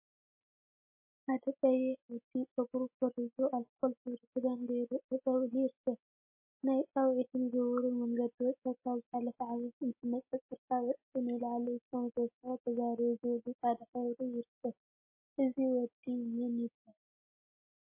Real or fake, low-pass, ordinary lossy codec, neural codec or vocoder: real; 3.6 kHz; MP3, 24 kbps; none